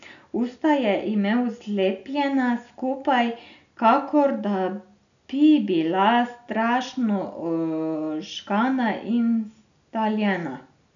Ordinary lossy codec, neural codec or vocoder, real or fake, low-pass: none; none; real; 7.2 kHz